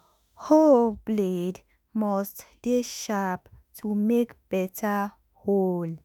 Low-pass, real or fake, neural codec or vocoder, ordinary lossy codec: none; fake; autoencoder, 48 kHz, 32 numbers a frame, DAC-VAE, trained on Japanese speech; none